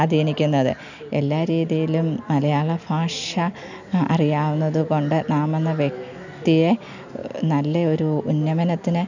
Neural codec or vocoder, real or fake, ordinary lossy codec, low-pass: none; real; none; 7.2 kHz